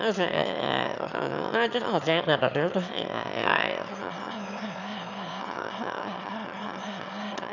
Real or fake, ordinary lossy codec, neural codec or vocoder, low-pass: fake; none; autoencoder, 22.05 kHz, a latent of 192 numbers a frame, VITS, trained on one speaker; 7.2 kHz